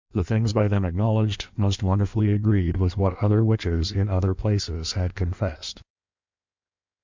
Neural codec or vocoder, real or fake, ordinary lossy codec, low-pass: codec, 16 kHz in and 24 kHz out, 1.1 kbps, FireRedTTS-2 codec; fake; MP3, 64 kbps; 7.2 kHz